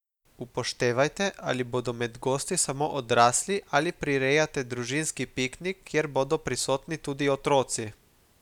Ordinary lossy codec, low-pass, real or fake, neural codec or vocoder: none; 19.8 kHz; real; none